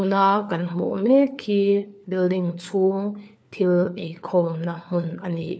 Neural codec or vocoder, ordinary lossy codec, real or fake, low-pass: codec, 16 kHz, 4 kbps, FunCodec, trained on LibriTTS, 50 frames a second; none; fake; none